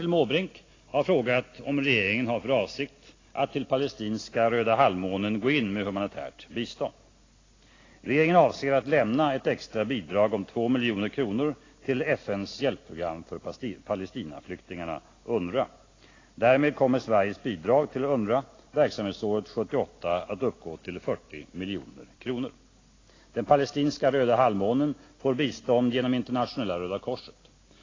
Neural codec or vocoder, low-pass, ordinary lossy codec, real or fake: none; 7.2 kHz; AAC, 32 kbps; real